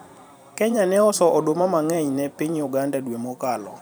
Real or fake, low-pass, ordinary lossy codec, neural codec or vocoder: fake; none; none; vocoder, 44.1 kHz, 128 mel bands every 512 samples, BigVGAN v2